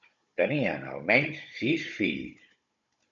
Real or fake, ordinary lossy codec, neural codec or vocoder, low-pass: fake; MP3, 48 kbps; codec, 16 kHz, 16 kbps, FunCodec, trained on Chinese and English, 50 frames a second; 7.2 kHz